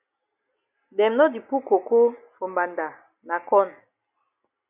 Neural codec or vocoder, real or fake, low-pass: none; real; 3.6 kHz